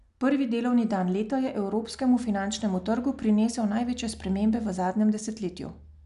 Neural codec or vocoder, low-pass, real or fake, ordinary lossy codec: none; 10.8 kHz; real; none